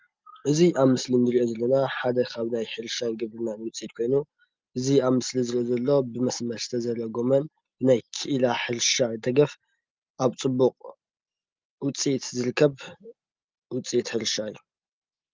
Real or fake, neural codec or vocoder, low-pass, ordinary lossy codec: real; none; 7.2 kHz; Opus, 24 kbps